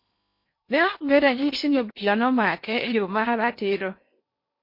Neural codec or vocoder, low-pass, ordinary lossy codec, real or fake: codec, 16 kHz in and 24 kHz out, 0.8 kbps, FocalCodec, streaming, 65536 codes; 5.4 kHz; MP3, 32 kbps; fake